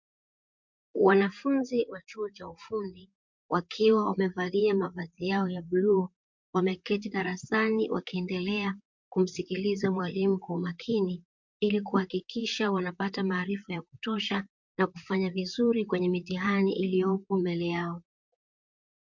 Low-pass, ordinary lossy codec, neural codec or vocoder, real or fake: 7.2 kHz; MP3, 48 kbps; vocoder, 44.1 kHz, 128 mel bands, Pupu-Vocoder; fake